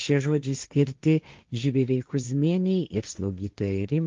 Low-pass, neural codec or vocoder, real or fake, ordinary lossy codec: 7.2 kHz; codec, 16 kHz, 1.1 kbps, Voila-Tokenizer; fake; Opus, 32 kbps